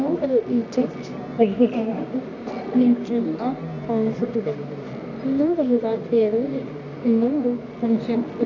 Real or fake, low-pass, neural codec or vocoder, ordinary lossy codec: fake; 7.2 kHz; codec, 24 kHz, 0.9 kbps, WavTokenizer, medium music audio release; none